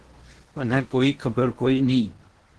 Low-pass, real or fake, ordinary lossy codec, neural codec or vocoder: 10.8 kHz; fake; Opus, 16 kbps; codec, 16 kHz in and 24 kHz out, 0.8 kbps, FocalCodec, streaming, 65536 codes